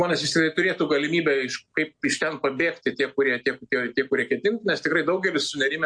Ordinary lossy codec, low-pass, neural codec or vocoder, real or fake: MP3, 48 kbps; 9.9 kHz; none; real